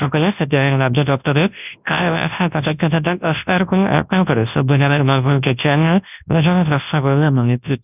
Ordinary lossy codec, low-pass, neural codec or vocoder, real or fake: none; 3.6 kHz; codec, 24 kHz, 0.9 kbps, WavTokenizer, large speech release; fake